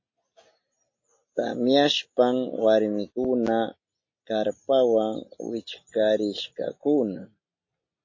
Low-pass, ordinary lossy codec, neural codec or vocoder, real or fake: 7.2 kHz; MP3, 32 kbps; none; real